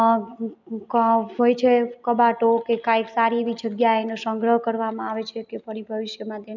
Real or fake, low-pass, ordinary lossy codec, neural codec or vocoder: real; 7.2 kHz; none; none